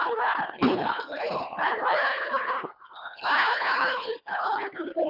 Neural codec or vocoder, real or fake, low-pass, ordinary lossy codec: codec, 24 kHz, 1.5 kbps, HILCodec; fake; 5.4 kHz; Opus, 64 kbps